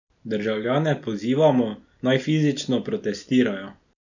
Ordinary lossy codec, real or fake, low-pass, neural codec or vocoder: none; real; 7.2 kHz; none